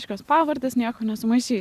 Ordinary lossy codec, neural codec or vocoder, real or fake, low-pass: Opus, 64 kbps; none; real; 14.4 kHz